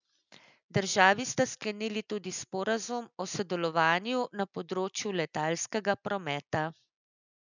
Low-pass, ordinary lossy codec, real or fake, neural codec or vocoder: 7.2 kHz; none; real; none